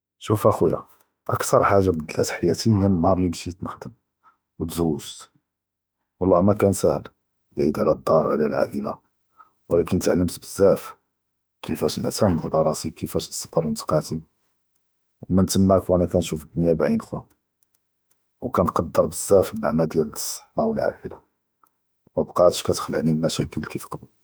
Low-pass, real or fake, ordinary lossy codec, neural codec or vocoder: none; fake; none; autoencoder, 48 kHz, 32 numbers a frame, DAC-VAE, trained on Japanese speech